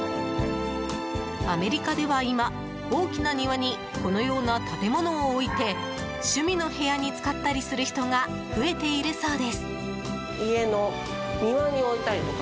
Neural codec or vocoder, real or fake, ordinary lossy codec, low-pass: none; real; none; none